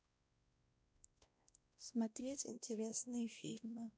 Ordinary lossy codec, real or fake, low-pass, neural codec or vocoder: none; fake; none; codec, 16 kHz, 1 kbps, X-Codec, WavLM features, trained on Multilingual LibriSpeech